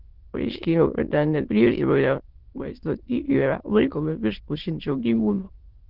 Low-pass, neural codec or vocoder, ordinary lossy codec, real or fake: 5.4 kHz; autoencoder, 22.05 kHz, a latent of 192 numbers a frame, VITS, trained on many speakers; Opus, 16 kbps; fake